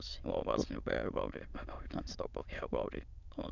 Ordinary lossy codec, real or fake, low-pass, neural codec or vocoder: none; fake; 7.2 kHz; autoencoder, 22.05 kHz, a latent of 192 numbers a frame, VITS, trained on many speakers